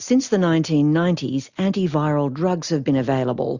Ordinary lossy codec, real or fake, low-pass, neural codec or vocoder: Opus, 64 kbps; real; 7.2 kHz; none